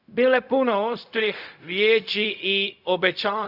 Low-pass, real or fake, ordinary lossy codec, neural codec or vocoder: 5.4 kHz; fake; Opus, 64 kbps; codec, 16 kHz, 0.4 kbps, LongCat-Audio-Codec